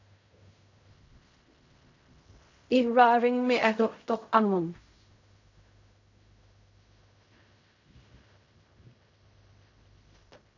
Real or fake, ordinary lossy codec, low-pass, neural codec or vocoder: fake; AAC, 48 kbps; 7.2 kHz; codec, 16 kHz in and 24 kHz out, 0.4 kbps, LongCat-Audio-Codec, fine tuned four codebook decoder